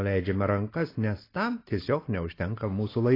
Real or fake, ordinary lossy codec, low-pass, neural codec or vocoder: real; AAC, 24 kbps; 5.4 kHz; none